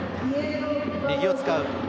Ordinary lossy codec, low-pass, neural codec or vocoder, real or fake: none; none; none; real